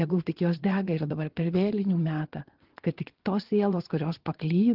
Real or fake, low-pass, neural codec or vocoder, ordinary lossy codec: fake; 5.4 kHz; vocoder, 22.05 kHz, 80 mel bands, Vocos; Opus, 16 kbps